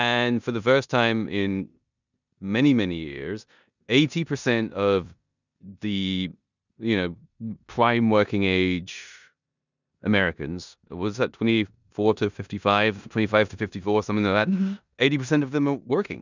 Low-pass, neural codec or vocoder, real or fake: 7.2 kHz; codec, 16 kHz in and 24 kHz out, 0.9 kbps, LongCat-Audio-Codec, four codebook decoder; fake